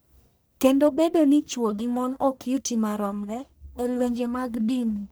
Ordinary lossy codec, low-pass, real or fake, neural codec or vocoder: none; none; fake; codec, 44.1 kHz, 1.7 kbps, Pupu-Codec